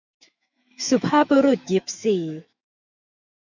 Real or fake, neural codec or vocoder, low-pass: fake; autoencoder, 48 kHz, 128 numbers a frame, DAC-VAE, trained on Japanese speech; 7.2 kHz